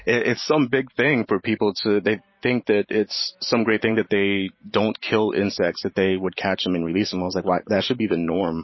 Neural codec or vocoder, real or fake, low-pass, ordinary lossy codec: vocoder, 44.1 kHz, 128 mel bands every 512 samples, BigVGAN v2; fake; 7.2 kHz; MP3, 24 kbps